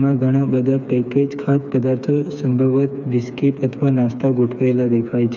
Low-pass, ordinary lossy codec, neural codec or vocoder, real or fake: 7.2 kHz; none; codec, 16 kHz, 4 kbps, FreqCodec, smaller model; fake